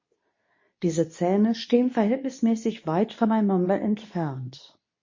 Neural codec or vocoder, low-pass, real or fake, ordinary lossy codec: codec, 24 kHz, 0.9 kbps, WavTokenizer, medium speech release version 2; 7.2 kHz; fake; MP3, 32 kbps